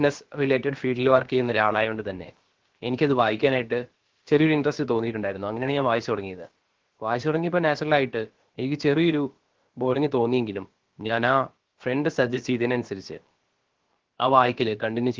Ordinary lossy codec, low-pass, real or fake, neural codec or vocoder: Opus, 16 kbps; 7.2 kHz; fake; codec, 16 kHz, about 1 kbps, DyCAST, with the encoder's durations